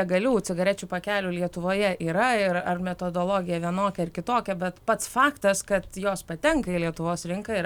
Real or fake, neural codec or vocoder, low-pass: real; none; 19.8 kHz